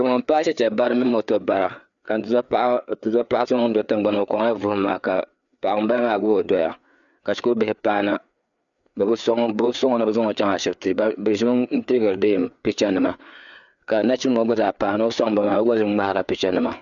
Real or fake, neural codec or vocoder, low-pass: fake; codec, 16 kHz, 4 kbps, FreqCodec, larger model; 7.2 kHz